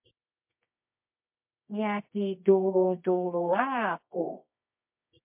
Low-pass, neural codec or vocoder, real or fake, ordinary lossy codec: 3.6 kHz; codec, 24 kHz, 0.9 kbps, WavTokenizer, medium music audio release; fake; MP3, 32 kbps